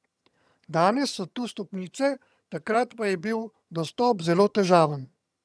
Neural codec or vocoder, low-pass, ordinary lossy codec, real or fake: vocoder, 22.05 kHz, 80 mel bands, HiFi-GAN; none; none; fake